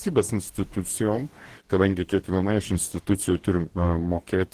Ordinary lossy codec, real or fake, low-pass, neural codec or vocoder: Opus, 16 kbps; fake; 14.4 kHz; codec, 44.1 kHz, 2.6 kbps, DAC